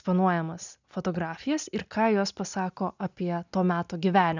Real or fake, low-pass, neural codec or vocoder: real; 7.2 kHz; none